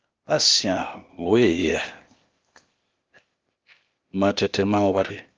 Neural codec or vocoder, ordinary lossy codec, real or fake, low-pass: codec, 16 kHz, 0.8 kbps, ZipCodec; Opus, 24 kbps; fake; 7.2 kHz